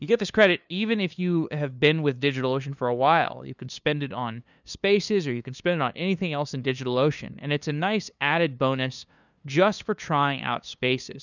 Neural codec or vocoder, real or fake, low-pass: codec, 16 kHz, 2 kbps, FunCodec, trained on LibriTTS, 25 frames a second; fake; 7.2 kHz